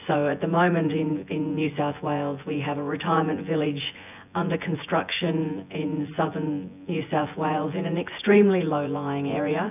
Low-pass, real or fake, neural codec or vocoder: 3.6 kHz; fake; vocoder, 24 kHz, 100 mel bands, Vocos